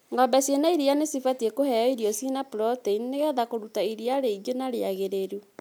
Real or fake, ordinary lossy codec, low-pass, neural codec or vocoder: real; none; none; none